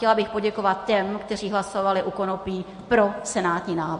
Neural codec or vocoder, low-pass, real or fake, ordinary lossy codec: none; 10.8 kHz; real; MP3, 48 kbps